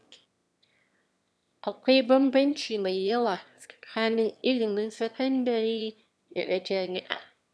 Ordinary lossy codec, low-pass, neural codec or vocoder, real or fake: none; none; autoencoder, 22.05 kHz, a latent of 192 numbers a frame, VITS, trained on one speaker; fake